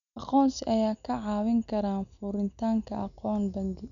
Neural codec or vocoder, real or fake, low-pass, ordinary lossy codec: none; real; 7.2 kHz; none